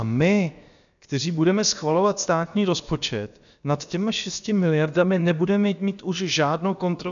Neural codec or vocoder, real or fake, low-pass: codec, 16 kHz, about 1 kbps, DyCAST, with the encoder's durations; fake; 7.2 kHz